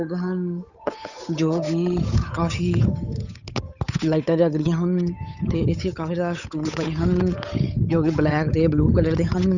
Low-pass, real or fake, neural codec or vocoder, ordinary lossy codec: 7.2 kHz; fake; codec, 16 kHz, 8 kbps, FunCodec, trained on Chinese and English, 25 frames a second; none